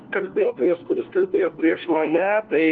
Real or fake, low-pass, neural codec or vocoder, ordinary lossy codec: fake; 7.2 kHz; codec, 16 kHz, 1 kbps, FunCodec, trained on LibriTTS, 50 frames a second; Opus, 16 kbps